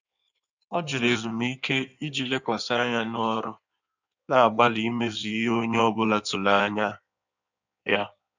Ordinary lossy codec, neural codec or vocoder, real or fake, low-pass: none; codec, 16 kHz in and 24 kHz out, 1.1 kbps, FireRedTTS-2 codec; fake; 7.2 kHz